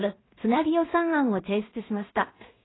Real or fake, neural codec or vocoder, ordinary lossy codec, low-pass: fake; codec, 16 kHz in and 24 kHz out, 0.4 kbps, LongCat-Audio-Codec, two codebook decoder; AAC, 16 kbps; 7.2 kHz